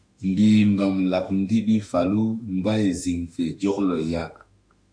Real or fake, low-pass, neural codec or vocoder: fake; 9.9 kHz; autoencoder, 48 kHz, 32 numbers a frame, DAC-VAE, trained on Japanese speech